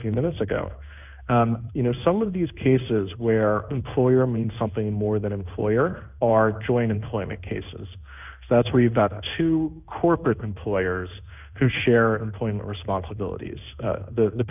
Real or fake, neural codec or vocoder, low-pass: fake; codec, 16 kHz in and 24 kHz out, 1 kbps, XY-Tokenizer; 3.6 kHz